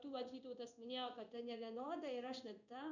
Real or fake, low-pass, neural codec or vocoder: fake; 7.2 kHz; codec, 16 kHz in and 24 kHz out, 1 kbps, XY-Tokenizer